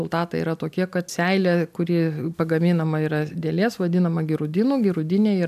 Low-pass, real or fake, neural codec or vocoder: 14.4 kHz; real; none